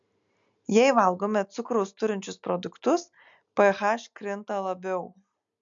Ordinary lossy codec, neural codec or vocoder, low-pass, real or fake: MP3, 64 kbps; none; 7.2 kHz; real